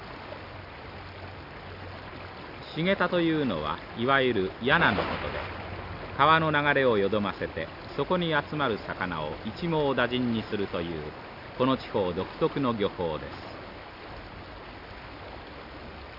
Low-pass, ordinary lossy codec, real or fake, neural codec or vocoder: 5.4 kHz; none; real; none